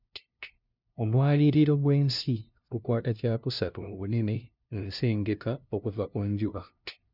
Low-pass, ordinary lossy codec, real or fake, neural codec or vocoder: 5.4 kHz; none; fake; codec, 16 kHz, 0.5 kbps, FunCodec, trained on LibriTTS, 25 frames a second